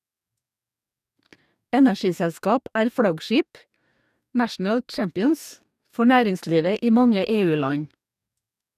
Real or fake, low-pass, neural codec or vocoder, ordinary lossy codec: fake; 14.4 kHz; codec, 44.1 kHz, 2.6 kbps, DAC; none